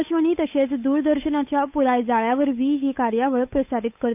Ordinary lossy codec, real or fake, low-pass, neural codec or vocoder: none; fake; 3.6 kHz; codec, 16 kHz, 8 kbps, FunCodec, trained on Chinese and English, 25 frames a second